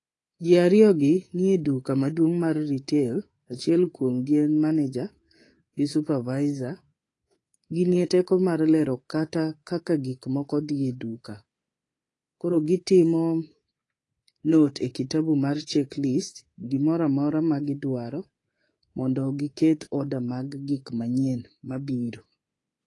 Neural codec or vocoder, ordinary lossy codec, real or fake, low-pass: codec, 24 kHz, 3.1 kbps, DualCodec; AAC, 32 kbps; fake; 10.8 kHz